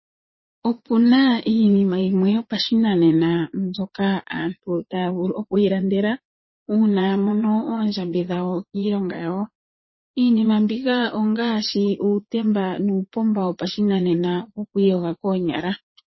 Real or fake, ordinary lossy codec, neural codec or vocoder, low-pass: fake; MP3, 24 kbps; vocoder, 22.05 kHz, 80 mel bands, Vocos; 7.2 kHz